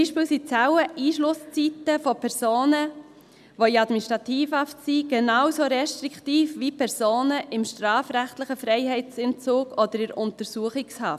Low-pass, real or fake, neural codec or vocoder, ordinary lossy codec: 14.4 kHz; real; none; none